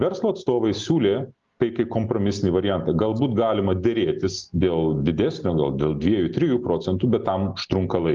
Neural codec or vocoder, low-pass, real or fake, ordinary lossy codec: none; 7.2 kHz; real; Opus, 32 kbps